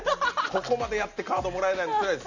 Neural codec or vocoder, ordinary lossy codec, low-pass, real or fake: none; none; 7.2 kHz; real